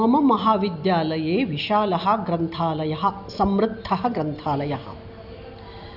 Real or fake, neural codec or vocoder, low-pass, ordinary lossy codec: real; none; 5.4 kHz; none